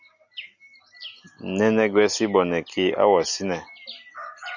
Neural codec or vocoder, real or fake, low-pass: none; real; 7.2 kHz